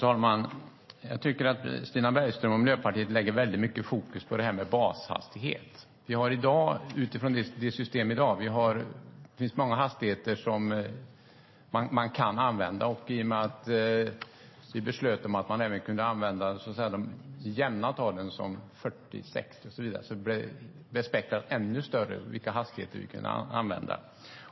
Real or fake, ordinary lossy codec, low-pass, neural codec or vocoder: real; MP3, 24 kbps; 7.2 kHz; none